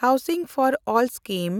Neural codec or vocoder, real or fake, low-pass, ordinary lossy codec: none; real; none; none